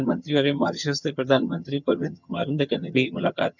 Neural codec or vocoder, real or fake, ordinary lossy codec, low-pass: vocoder, 22.05 kHz, 80 mel bands, HiFi-GAN; fake; none; 7.2 kHz